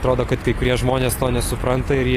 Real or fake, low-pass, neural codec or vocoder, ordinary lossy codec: real; 14.4 kHz; none; AAC, 48 kbps